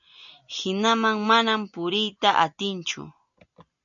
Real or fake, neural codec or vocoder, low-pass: real; none; 7.2 kHz